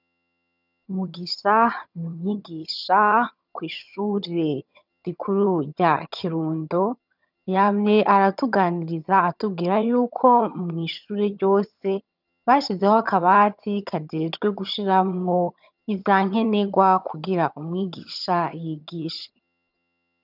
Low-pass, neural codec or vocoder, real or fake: 5.4 kHz; vocoder, 22.05 kHz, 80 mel bands, HiFi-GAN; fake